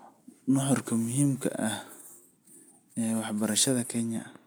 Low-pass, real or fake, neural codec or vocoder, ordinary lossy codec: none; real; none; none